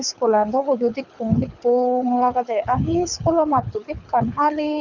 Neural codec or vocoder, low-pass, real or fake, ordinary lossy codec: codec, 24 kHz, 6 kbps, HILCodec; 7.2 kHz; fake; none